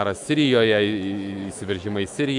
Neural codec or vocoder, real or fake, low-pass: autoencoder, 48 kHz, 128 numbers a frame, DAC-VAE, trained on Japanese speech; fake; 10.8 kHz